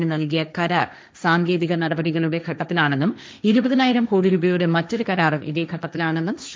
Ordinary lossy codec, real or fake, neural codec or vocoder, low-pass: none; fake; codec, 16 kHz, 1.1 kbps, Voila-Tokenizer; none